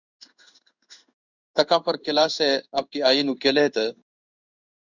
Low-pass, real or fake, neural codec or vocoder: 7.2 kHz; fake; codec, 16 kHz in and 24 kHz out, 1 kbps, XY-Tokenizer